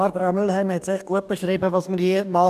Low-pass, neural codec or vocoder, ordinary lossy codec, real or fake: 14.4 kHz; codec, 44.1 kHz, 2.6 kbps, DAC; none; fake